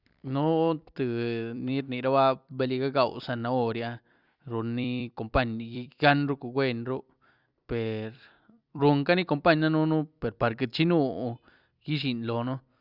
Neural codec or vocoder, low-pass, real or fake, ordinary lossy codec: vocoder, 44.1 kHz, 128 mel bands every 256 samples, BigVGAN v2; 5.4 kHz; fake; Opus, 64 kbps